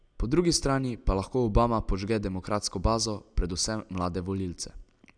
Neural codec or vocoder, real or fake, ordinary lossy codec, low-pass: none; real; none; 9.9 kHz